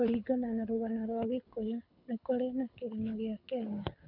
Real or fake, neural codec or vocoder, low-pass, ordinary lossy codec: fake; codec, 24 kHz, 6 kbps, HILCodec; 5.4 kHz; none